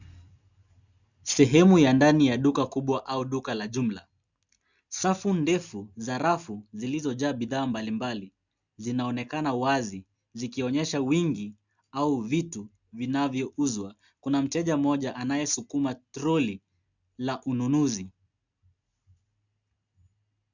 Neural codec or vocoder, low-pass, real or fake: none; 7.2 kHz; real